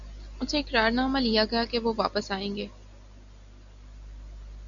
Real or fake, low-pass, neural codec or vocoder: real; 7.2 kHz; none